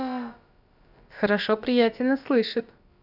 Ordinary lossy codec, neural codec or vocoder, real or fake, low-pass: AAC, 48 kbps; codec, 16 kHz, about 1 kbps, DyCAST, with the encoder's durations; fake; 5.4 kHz